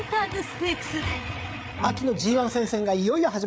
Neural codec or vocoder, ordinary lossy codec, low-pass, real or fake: codec, 16 kHz, 8 kbps, FreqCodec, larger model; none; none; fake